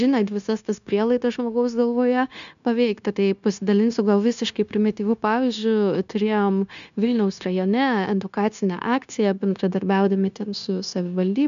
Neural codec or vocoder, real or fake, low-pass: codec, 16 kHz, 0.9 kbps, LongCat-Audio-Codec; fake; 7.2 kHz